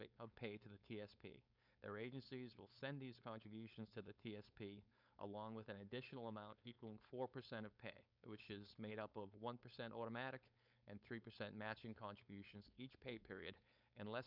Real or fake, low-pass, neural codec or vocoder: fake; 5.4 kHz; codec, 16 kHz, 4.8 kbps, FACodec